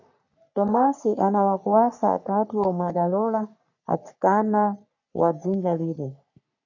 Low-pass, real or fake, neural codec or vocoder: 7.2 kHz; fake; codec, 44.1 kHz, 3.4 kbps, Pupu-Codec